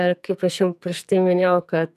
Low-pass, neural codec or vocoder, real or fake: 14.4 kHz; codec, 44.1 kHz, 2.6 kbps, SNAC; fake